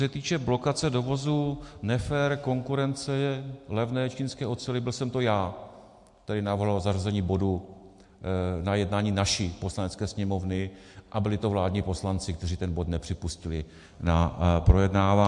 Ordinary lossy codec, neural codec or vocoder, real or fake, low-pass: MP3, 64 kbps; none; real; 10.8 kHz